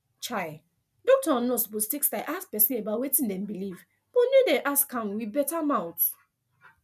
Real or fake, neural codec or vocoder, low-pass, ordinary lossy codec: real; none; 14.4 kHz; none